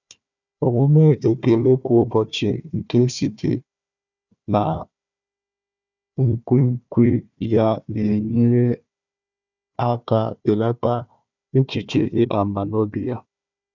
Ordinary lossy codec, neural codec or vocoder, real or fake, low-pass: none; codec, 16 kHz, 1 kbps, FunCodec, trained on Chinese and English, 50 frames a second; fake; 7.2 kHz